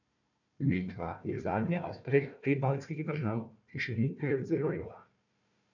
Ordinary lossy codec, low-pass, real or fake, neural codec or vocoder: none; 7.2 kHz; fake; codec, 16 kHz, 1 kbps, FunCodec, trained on Chinese and English, 50 frames a second